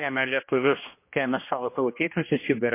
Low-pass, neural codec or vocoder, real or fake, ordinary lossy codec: 3.6 kHz; codec, 16 kHz, 1 kbps, X-Codec, HuBERT features, trained on general audio; fake; MP3, 24 kbps